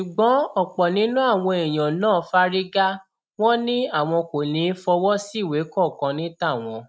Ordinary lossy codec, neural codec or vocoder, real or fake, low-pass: none; none; real; none